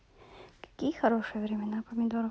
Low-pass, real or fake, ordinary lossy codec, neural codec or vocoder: none; real; none; none